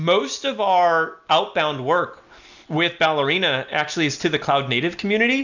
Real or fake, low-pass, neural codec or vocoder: real; 7.2 kHz; none